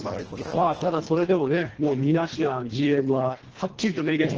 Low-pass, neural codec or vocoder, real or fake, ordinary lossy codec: 7.2 kHz; codec, 24 kHz, 1.5 kbps, HILCodec; fake; Opus, 16 kbps